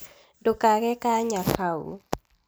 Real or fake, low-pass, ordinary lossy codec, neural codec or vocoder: real; none; none; none